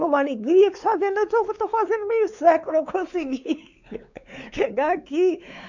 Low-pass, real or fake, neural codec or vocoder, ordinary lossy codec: 7.2 kHz; fake; codec, 16 kHz, 4 kbps, X-Codec, WavLM features, trained on Multilingual LibriSpeech; none